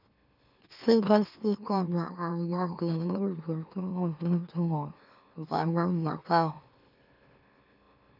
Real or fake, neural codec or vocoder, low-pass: fake; autoencoder, 44.1 kHz, a latent of 192 numbers a frame, MeloTTS; 5.4 kHz